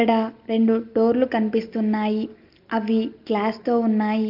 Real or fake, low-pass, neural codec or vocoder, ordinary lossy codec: real; 5.4 kHz; none; Opus, 24 kbps